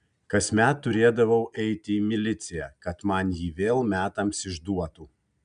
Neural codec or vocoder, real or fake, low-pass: none; real; 9.9 kHz